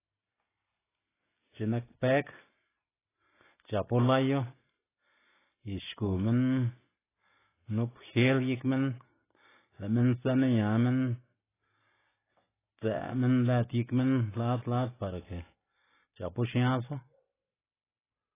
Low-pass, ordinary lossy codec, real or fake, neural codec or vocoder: 3.6 kHz; AAC, 16 kbps; real; none